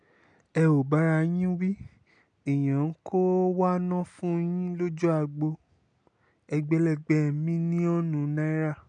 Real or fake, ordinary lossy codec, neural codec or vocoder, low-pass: real; none; none; 9.9 kHz